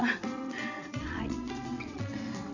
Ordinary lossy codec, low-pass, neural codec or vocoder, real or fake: none; 7.2 kHz; vocoder, 22.05 kHz, 80 mel bands, WaveNeXt; fake